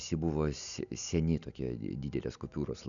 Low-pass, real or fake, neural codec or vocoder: 7.2 kHz; real; none